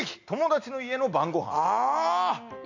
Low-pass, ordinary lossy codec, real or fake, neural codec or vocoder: 7.2 kHz; none; real; none